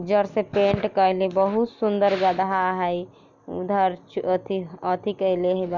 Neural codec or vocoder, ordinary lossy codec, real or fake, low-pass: none; none; real; 7.2 kHz